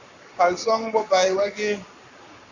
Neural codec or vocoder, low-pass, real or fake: codec, 44.1 kHz, 7.8 kbps, Pupu-Codec; 7.2 kHz; fake